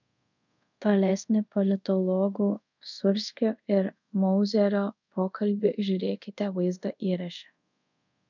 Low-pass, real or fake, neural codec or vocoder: 7.2 kHz; fake; codec, 24 kHz, 0.5 kbps, DualCodec